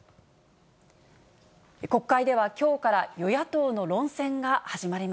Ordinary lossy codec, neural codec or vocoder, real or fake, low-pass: none; none; real; none